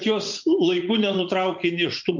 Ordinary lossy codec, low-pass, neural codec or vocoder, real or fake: MP3, 48 kbps; 7.2 kHz; vocoder, 44.1 kHz, 80 mel bands, Vocos; fake